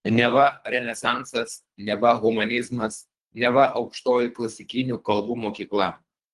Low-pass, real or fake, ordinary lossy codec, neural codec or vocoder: 10.8 kHz; fake; Opus, 24 kbps; codec, 24 kHz, 3 kbps, HILCodec